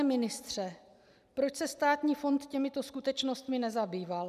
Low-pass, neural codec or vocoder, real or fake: 14.4 kHz; none; real